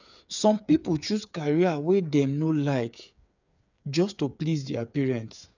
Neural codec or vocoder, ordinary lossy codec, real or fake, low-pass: codec, 16 kHz, 8 kbps, FreqCodec, smaller model; none; fake; 7.2 kHz